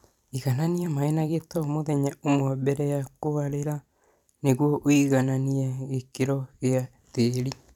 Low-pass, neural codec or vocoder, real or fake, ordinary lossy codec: 19.8 kHz; vocoder, 44.1 kHz, 128 mel bands every 256 samples, BigVGAN v2; fake; none